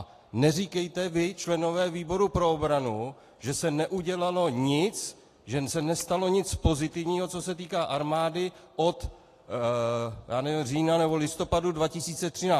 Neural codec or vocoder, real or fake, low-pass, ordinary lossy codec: none; real; 14.4 kHz; AAC, 48 kbps